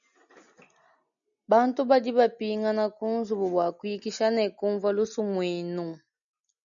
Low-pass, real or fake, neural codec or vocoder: 7.2 kHz; real; none